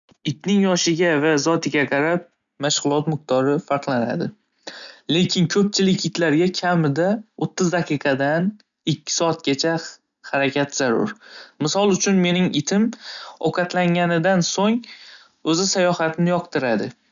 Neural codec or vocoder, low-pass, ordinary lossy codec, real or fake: none; 7.2 kHz; none; real